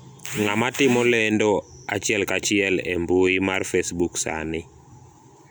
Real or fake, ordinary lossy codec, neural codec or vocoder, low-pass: real; none; none; none